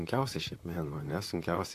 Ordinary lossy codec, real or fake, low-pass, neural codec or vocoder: AAC, 64 kbps; fake; 14.4 kHz; vocoder, 44.1 kHz, 128 mel bands, Pupu-Vocoder